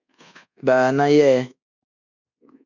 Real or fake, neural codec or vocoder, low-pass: fake; codec, 24 kHz, 1.2 kbps, DualCodec; 7.2 kHz